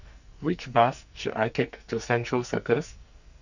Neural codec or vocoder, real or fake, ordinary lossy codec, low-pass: codec, 44.1 kHz, 2.6 kbps, SNAC; fake; none; 7.2 kHz